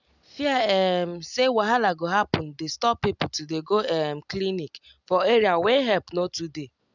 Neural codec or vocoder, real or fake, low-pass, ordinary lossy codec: none; real; 7.2 kHz; none